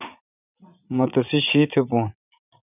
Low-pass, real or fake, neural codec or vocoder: 3.6 kHz; fake; vocoder, 44.1 kHz, 80 mel bands, Vocos